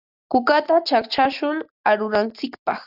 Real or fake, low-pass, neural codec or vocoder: real; 5.4 kHz; none